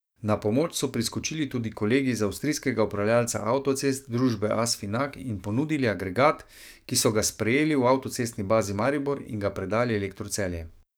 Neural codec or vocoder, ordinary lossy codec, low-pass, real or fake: codec, 44.1 kHz, 7.8 kbps, DAC; none; none; fake